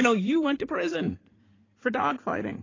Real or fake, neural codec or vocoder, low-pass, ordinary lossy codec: fake; codec, 16 kHz in and 24 kHz out, 2.2 kbps, FireRedTTS-2 codec; 7.2 kHz; AAC, 32 kbps